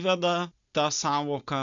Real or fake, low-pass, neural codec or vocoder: real; 7.2 kHz; none